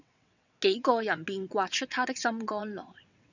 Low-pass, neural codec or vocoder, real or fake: 7.2 kHz; vocoder, 22.05 kHz, 80 mel bands, WaveNeXt; fake